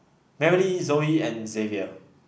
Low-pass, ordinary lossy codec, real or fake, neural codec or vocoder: none; none; real; none